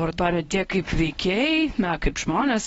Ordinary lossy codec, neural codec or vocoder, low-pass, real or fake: AAC, 24 kbps; codec, 24 kHz, 0.9 kbps, WavTokenizer, medium speech release version 1; 10.8 kHz; fake